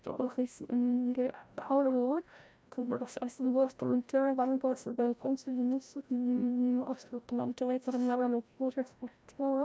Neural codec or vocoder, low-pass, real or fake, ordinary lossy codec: codec, 16 kHz, 0.5 kbps, FreqCodec, larger model; none; fake; none